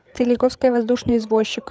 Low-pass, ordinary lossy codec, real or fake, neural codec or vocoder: none; none; fake; codec, 16 kHz, 8 kbps, FreqCodec, larger model